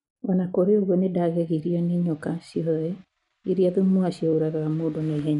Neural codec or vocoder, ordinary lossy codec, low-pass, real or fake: none; none; 10.8 kHz; real